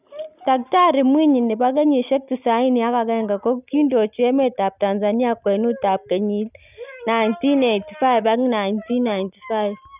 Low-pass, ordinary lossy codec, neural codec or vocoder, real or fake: 3.6 kHz; none; none; real